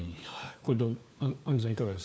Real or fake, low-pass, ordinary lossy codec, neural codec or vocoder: fake; none; none; codec, 16 kHz, 4 kbps, FunCodec, trained on LibriTTS, 50 frames a second